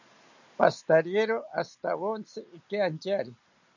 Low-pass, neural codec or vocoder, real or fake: 7.2 kHz; none; real